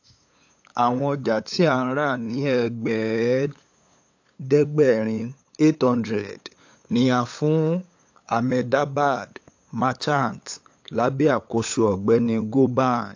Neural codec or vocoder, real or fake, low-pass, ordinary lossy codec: codec, 16 kHz, 8 kbps, FunCodec, trained on LibriTTS, 25 frames a second; fake; 7.2 kHz; AAC, 48 kbps